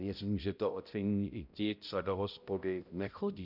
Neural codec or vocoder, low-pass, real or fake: codec, 16 kHz, 0.5 kbps, X-Codec, HuBERT features, trained on balanced general audio; 5.4 kHz; fake